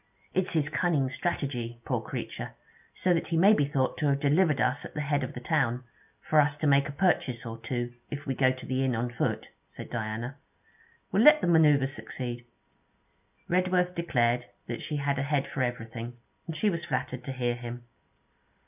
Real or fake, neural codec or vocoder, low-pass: real; none; 3.6 kHz